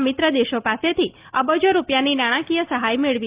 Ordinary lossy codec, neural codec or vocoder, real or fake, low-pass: Opus, 32 kbps; none; real; 3.6 kHz